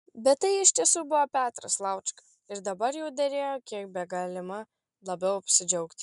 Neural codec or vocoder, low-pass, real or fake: none; 10.8 kHz; real